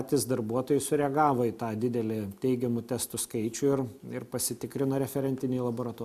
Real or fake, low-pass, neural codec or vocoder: real; 14.4 kHz; none